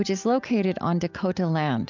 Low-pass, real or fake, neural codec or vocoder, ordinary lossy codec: 7.2 kHz; real; none; MP3, 64 kbps